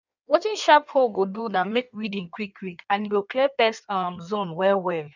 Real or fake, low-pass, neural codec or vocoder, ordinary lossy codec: fake; 7.2 kHz; codec, 16 kHz in and 24 kHz out, 1.1 kbps, FireRedTTS-2 codec; none